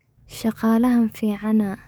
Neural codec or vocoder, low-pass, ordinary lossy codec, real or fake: codec, 44.1 kHz, 7.8 kbps, DAC; none; none; fake